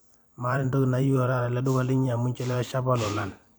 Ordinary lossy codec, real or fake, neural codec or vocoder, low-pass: none; fake; vocoder, 44.1 kHz, 128 mel bands every 512 samples, BigVGAN v2; none